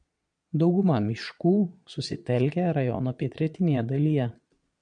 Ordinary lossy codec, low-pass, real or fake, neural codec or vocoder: MP3, 96 kbps; 9.9 kHz; fake; vocoder, 22.05 kHz, 80 mel bands, Vocos